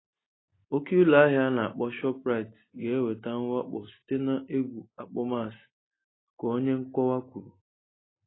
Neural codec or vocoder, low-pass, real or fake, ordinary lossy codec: none; 7.2 kHz; real; AAC, 16 kbps